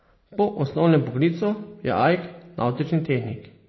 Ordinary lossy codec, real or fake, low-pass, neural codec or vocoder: MP3, 24 kbps; real; 7.2 kHz; none